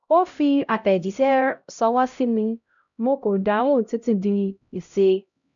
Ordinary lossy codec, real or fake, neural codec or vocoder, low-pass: none; fake; codec, 16 kHz, 0.5 kbps, X-Codec, HuBERT features, trained on LibriSpeech; 7.2 kHz